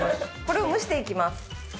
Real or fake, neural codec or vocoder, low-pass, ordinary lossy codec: real; none; none; none